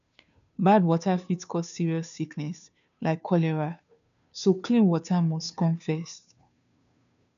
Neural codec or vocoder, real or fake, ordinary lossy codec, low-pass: codec, 16 kHz, 2 kbps, FunCodec, trained on Chinese and English, 25 frames a second; fake; none; 7.2 kHz